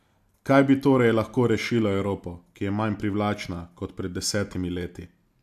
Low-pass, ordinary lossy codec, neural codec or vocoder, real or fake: 14.4 kHz; MP3, 96 kbps; none; real